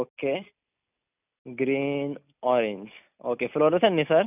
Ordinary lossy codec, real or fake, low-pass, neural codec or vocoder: none; real; 3.6 kHz; none